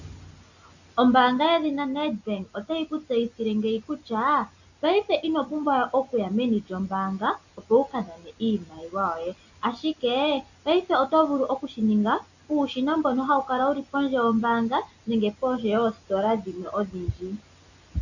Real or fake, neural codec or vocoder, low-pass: real; none; 7.2 kHz